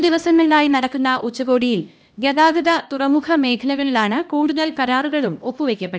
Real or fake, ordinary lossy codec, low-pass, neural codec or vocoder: fake; none; none; codec, 16 kHz, 1 kbps, X-Codec, HuBERT features, trained on LibriSpeech